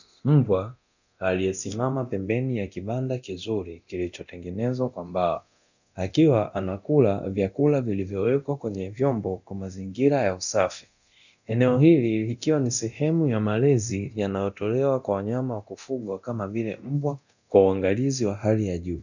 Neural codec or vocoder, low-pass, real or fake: codec, 24 kHz, 0.9 kbps, DualCodec; 7.2 kHz; fake